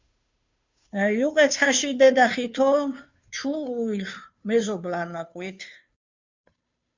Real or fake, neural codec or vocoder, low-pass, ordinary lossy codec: fake; codec, 16 kHz, 2 kbps, FunCodec, trained on Chinese and English, 25 frames a second; 7.2 kHz; MP3, 64 kbps